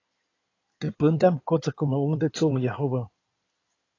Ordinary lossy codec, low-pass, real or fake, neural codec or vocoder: AAC, 32 kbps; 7.2 kHz; fake; codec, 16 kHz in and 24 kHz out, 2.2 kbps, FireRedTTS-2 codec